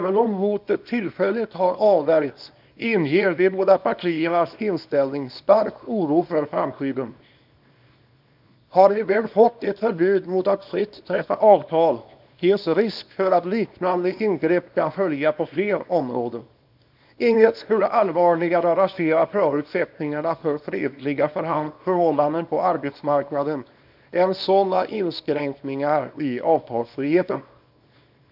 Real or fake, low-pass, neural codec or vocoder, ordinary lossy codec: fake; 5.4 kHz; codec, 24 kHz, 0.9 kbps, WavTokenizer, small release; none